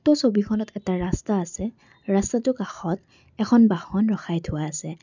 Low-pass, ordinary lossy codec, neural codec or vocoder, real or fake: 7.2 kHz; none; none; real